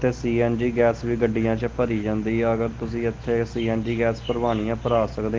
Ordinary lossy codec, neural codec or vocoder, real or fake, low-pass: Opus, 16 kbps; none; real; 7.2 kHz